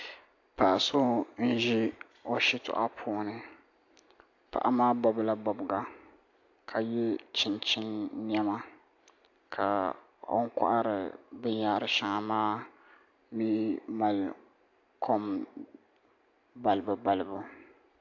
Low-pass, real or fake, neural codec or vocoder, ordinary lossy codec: 7.2 kHz; real; none; AAC, 48 kbps